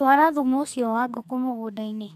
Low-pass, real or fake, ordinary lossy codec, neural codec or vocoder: 14.4 kHz; fake; none; codec, 32 kHz, 1.9 kbps, SNAC